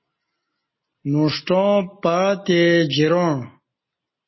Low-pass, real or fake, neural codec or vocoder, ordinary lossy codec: 7.2 kHz; real; none; MP3, 24 kbps